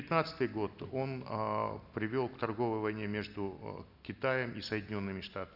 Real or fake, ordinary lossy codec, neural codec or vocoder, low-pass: real; none; none; 5.4 kHz